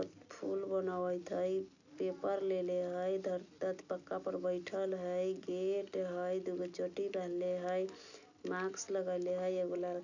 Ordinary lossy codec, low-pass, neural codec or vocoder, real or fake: none; 7.2 kHz; none; real